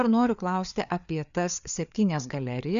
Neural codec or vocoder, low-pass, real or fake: codec, 16 kHz, 4 kbps, FreqCodec, larger model; 7.2 kHz; fake